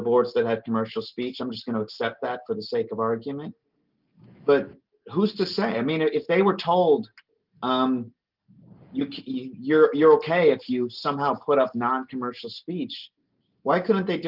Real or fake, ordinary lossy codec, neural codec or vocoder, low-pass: real; Opus, 24 kbps; none; 5.4 kHz